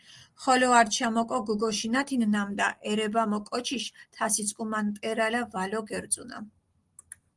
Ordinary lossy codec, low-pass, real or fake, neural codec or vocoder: Opus, 24 kbps; 10.8 kHz; real; none